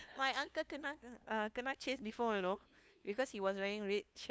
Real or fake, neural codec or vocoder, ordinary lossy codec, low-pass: fake; codec, 16 kHz, 2 kbps, FunCodec, trained on LibriTTS, 25 frames a second; none; none